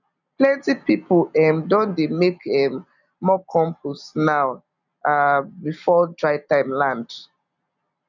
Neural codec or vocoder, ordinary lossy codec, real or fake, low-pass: none; none; real; 7.2 kHz